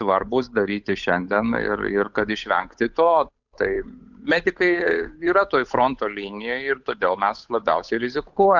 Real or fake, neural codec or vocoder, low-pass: fake; codec, 16 kHz, 8 kbps, FunCodec, trained on Chinese and English, 25 frames a second; 7.2 kHz